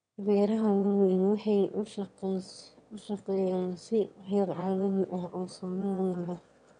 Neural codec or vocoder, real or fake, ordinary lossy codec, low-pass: autoencoder, 22.05 kHz, a latent of 192 numbers a frame, VITS, trained on one speaker; fake; none; 9.9 kHz